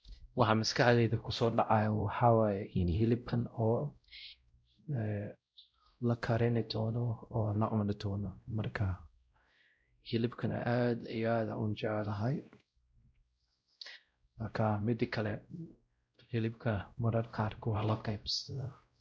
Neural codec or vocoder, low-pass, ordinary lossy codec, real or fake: codec, 16 kHz, 0.5 kbps, X-Codec, WavLM features, trained on Multilingual LibriSpeech; none; none; fake